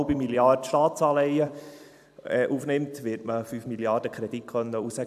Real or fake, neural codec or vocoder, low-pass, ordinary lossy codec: fake; vocoder, 44.1 kHz, 128 mel bands every 256 samples, BigVGAN v2; 14.4 kHz; AAC, 96 kbps